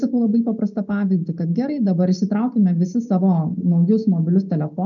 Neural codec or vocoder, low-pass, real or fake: none; 7.2 kHz; real